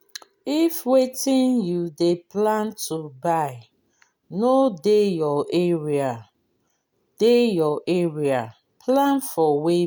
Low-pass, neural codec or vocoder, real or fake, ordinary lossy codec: none; none; real; none